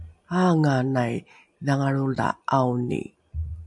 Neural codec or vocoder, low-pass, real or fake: none; 10.8 kHz; real